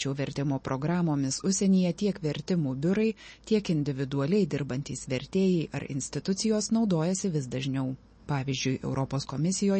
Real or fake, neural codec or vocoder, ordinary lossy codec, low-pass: real; none; MP3, 32 kbps; 10.8 kHz